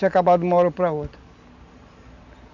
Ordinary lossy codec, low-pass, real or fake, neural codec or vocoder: none; 7.2 kHz; real; none